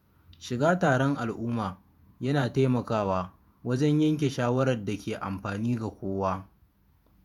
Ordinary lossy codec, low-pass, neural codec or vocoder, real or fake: none; 19.8 kHz; vocoder, 48 kHz, 128 mel bands, Vocos; fake